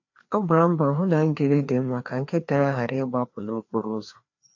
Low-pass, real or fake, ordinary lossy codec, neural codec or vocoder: 7.2 kHz; fake; AAC, 48 kbps; codec, 16 kHz, 2 kbps, FreqCodec, larger model